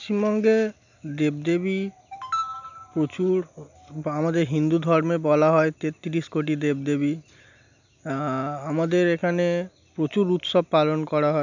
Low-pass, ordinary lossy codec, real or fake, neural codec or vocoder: 7.2 kHz; none; real; none